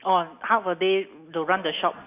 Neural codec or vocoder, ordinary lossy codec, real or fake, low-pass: none; none; real; 3.6 kHz